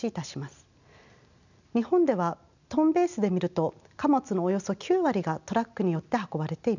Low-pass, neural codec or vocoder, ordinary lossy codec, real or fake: 7.2 kHz; none; none; real